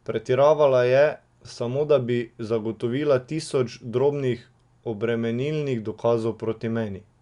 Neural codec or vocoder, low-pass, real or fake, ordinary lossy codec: none; 10.8 kHz; real; Opus, 64 kbps